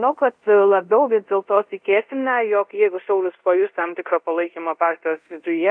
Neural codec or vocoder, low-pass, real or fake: codec, 24 kHz, 0.5 kbps, DualCodec; 9.9 kHz; fake